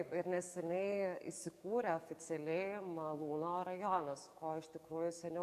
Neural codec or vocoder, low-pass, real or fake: codec, 44.1 kHz, 7.8 kbps, DAC; 14.4 kHz; fake